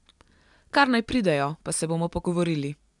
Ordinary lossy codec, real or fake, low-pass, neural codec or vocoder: none; real; 10.8 kHz; none